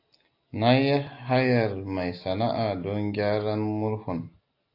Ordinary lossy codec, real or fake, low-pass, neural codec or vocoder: AAC, 32 kbps; real; 5.4 kHz; none